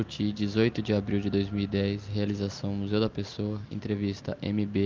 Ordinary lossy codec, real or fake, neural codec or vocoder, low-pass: Opus, 32 kbps; real; none; 7.2 kHz